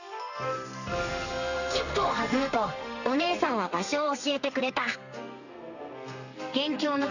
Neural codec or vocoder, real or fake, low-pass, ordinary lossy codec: codec, 32 kHz, 1.9 kbps, SNAC; fake; 7.2 kHz; none